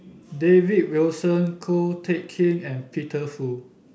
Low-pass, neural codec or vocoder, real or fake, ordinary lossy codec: none; none; real; none